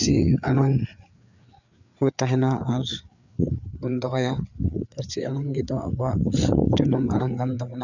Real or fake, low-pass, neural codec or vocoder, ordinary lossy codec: fake; 7.2 kHz; codec, 16 kHz, 4 kbps, FreqCodec, larger model; none